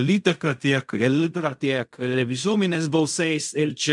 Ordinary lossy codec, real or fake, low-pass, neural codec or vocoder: MP3, 96 kbps; fake; 10.8 kHz; codec, 16 kHz in and 24 kHz out, 0.4 kbps, LongCat-Audio-Codec, fine tuned four codebook decoder